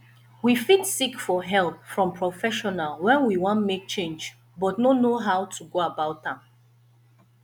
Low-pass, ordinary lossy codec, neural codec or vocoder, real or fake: none; none; none; real